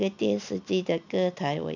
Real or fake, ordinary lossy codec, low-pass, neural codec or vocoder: real; none; 7.2 kHz; none